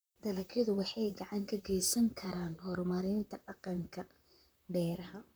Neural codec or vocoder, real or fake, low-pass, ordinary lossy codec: vocoder, 44.1 kHz, 128 mel bands, Pupu-Vocoder; fake; none; none